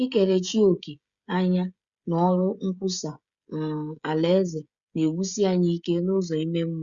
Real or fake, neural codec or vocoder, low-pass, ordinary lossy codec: fake; codec, 16 kHz, 16 kbps, FreqCodec, smaller model; 7.2 kHz; Opus, 64 kbps